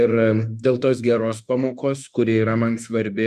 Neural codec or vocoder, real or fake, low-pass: autoencoder, 48 kHz, 32 numbers a frame, DAC-VAE, trained on Japanese speech; fake; 14.4 kHz